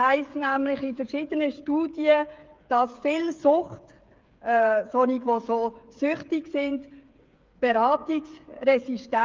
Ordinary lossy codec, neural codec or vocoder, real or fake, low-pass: Opus, 24 kbps; codec, 16 kHz, 8 kbps, FreqCodec, smaller model; fake; 7.2 kHz